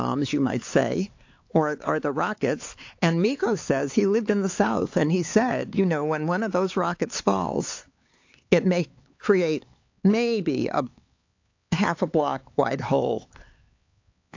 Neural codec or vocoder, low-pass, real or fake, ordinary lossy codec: codec, 16 kHz, 4 kbps, X-Codec, HuBERT features, trained on LibriSpeech; 7.2 kHz; fake; AAC, 48 kbps